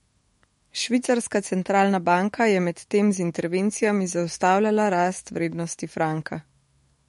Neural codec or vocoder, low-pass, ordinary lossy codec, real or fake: autoencoder, 48 kHz, 128 numbers a frame, DAC-VAE, trained on Japanese speech; 19.8 kHz; MP3, 48 kbps; fake